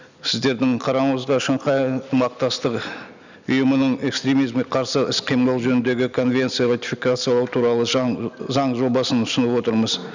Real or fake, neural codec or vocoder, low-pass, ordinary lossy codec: real; none; 7.2 kHz; none